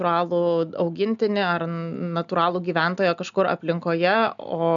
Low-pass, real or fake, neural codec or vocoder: 7.2 kHz; real; none